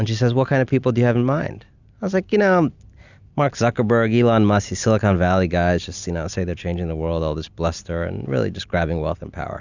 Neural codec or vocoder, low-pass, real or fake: none; 7.2 kHz; real